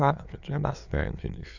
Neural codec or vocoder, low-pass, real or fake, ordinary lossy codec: autoencoder, 22.05 kHz, a latent of 192 numbers a frame, VITS, trained on many speakers; 7.2 kHz; fake; none